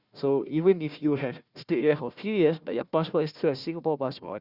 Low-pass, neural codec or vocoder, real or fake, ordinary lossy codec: 5.4 kHz; codec, 16 kHz, 1 kbps, FunCodec, trained on Chinese and English, 50 frames a second; fake; Opus, 64 kbps